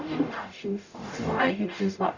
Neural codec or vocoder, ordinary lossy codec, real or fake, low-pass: codec, 44.1 kHz, 0.9 kbps, DAC; Opus, 64 kbps; fake; 7.2 kHz